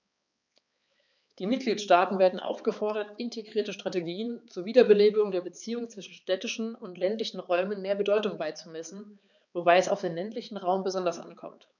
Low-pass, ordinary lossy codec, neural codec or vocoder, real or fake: 7.2 kHz; none; codec, 16 kHz, 4 kbps, X-Codec, HuBERT features, trained on balanced general audio; fake